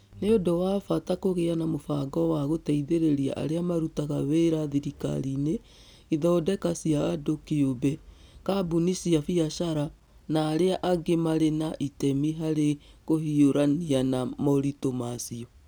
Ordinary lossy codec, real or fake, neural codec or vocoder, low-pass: none; real; none; none